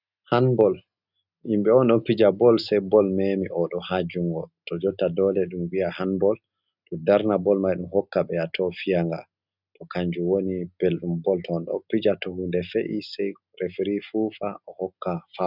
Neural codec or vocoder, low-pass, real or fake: none; 5.4 kHz; real